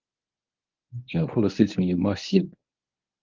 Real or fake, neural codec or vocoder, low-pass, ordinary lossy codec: fake; codec, 24 kHz, 0.9 kbps, WavTokenizer, medium speech release version 1; 7.2 kHz; Opus, 32 kbps